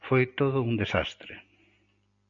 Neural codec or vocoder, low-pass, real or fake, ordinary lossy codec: none; 7.2 kHz; real; MP3, 48 kbps